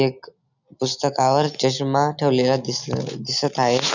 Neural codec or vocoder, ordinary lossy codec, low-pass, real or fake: none; none; 7.2 kHz; real